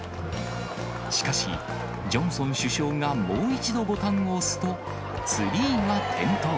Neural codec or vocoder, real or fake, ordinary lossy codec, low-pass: none; real; none; none